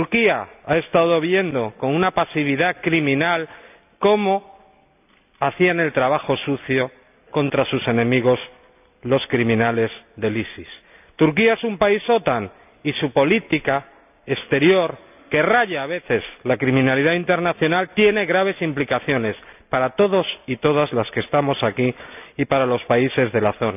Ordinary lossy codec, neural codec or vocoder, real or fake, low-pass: none; none; real; 3.6 kHz